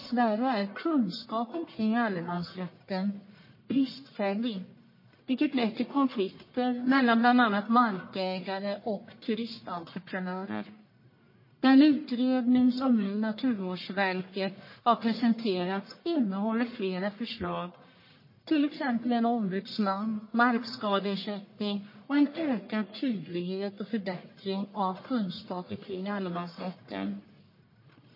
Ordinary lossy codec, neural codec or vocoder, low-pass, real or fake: MP3, 24 kbps; codec, 44.1 kHz, 1.7 kbps, Pupu-Codec; 5.4 kHz; fake